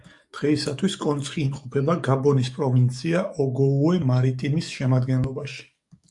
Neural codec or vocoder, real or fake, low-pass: codec, 44.1 kHz, 7.8 kbps, DAC; fake; 10.8 kHz